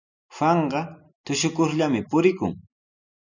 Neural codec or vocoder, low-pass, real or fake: none; 7.2 kHz; real